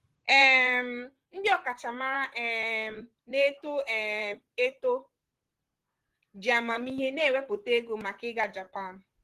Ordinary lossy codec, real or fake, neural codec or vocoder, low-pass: Opus, 16 kbps; fake; vocoder, 44.1 kHz, 128 mel bands, Pupu-Vocoder; 14.4 kHz